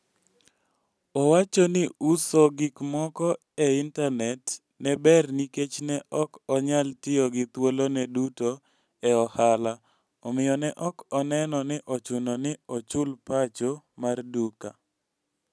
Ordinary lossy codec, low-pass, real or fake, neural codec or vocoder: none; none; real; none